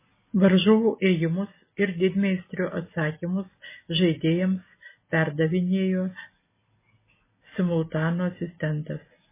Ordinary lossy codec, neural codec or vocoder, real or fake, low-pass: MP3, 16 kbps; none; real; 3.6 kHz